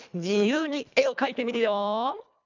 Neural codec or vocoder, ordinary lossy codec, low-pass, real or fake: codec, 24 kHz, 1.5 kbps, HILCodec; none; 7.2 kHz; fake